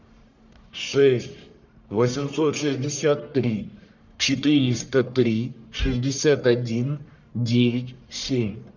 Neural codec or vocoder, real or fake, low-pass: codec, 44.1 kHz, 1.7 kbps, Pupu-Codec; fake; 7.2 kHz